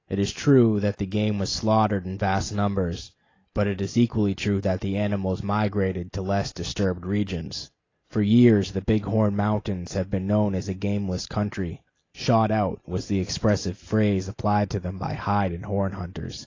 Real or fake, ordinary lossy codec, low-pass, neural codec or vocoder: real; AAC, 32 kbps; 7.2 kHz; none